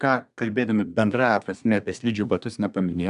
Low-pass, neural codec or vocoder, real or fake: 10.8 kHz; codec, 24 kHz, 1 kbps, SNAC; fake